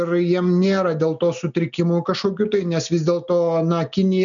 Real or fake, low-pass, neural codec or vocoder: real; 7.2 kHz; none